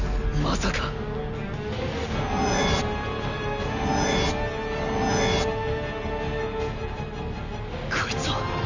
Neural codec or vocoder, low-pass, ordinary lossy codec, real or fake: none; 7.2 kHz; none; real